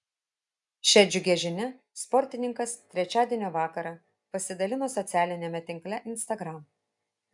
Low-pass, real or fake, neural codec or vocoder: 10.8 kHz; real; none